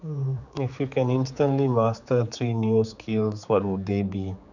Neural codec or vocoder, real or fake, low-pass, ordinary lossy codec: codec, 16 kHz, 4 kbps, X-Codec, HuBERT features, trained on general audio; fake; 7.2 kHz; none